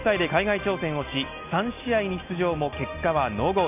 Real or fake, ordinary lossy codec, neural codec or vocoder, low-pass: real; none; none; 3.6 kHz